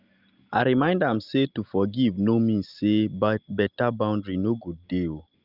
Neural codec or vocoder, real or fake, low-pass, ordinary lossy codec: none; real; 5.4 kHz; Opus, 24 kbps